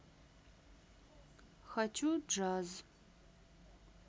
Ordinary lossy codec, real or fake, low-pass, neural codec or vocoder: none; real; none; none